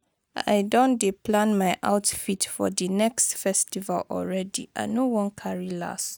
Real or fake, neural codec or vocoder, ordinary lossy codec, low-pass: real; none; none; none